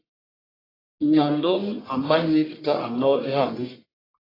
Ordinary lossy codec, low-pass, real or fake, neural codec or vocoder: AAC, 24 kbps; 5.4 kHz; fake; codec, 44.1 kHz, 1.7 kbps, Pupu-Codec